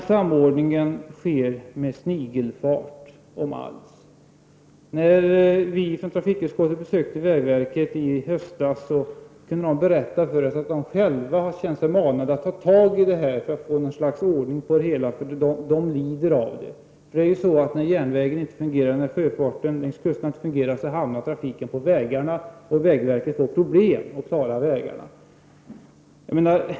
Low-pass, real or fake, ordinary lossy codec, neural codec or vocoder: none; real; none; none